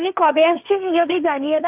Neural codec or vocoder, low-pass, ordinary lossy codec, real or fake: codec, 16 kHz, 1.1 kbps, Voila-Tokenizer; 3.6 kHz; AAC, 32 kbps; fake